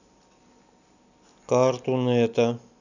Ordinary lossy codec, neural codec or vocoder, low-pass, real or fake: none; none; 7.2 kHz; real